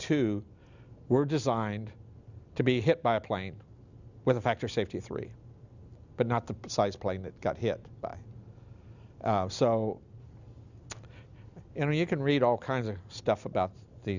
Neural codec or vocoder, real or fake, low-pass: none; real; 7.2 kHz